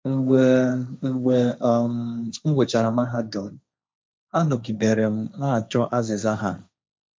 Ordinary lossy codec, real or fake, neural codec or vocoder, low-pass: none; fake; codec, 16 kHz, 1.1 kbps, Voila-Tokenizer; none